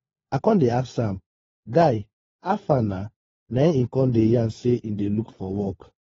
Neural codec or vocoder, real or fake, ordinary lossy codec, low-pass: codec, 16 kHz, 4 kbps, FunCodec, trained on LibriTTS, 50 frames a second; fake; AAC, 24 kbps; 7.2 kHz